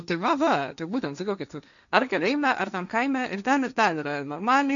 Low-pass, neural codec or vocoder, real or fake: 7.2 kHz; codec, 16 kHz, 1.1 kbps, Voila-Tokenizer; fake